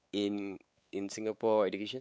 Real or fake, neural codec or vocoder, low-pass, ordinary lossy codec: fake; codec, 16 kHz, 4 kbps, X-Codec, WavLM features, trained on Multilingual LibriSpeech; none; none